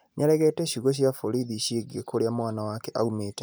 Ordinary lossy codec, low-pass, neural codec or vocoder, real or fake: none; none; none; real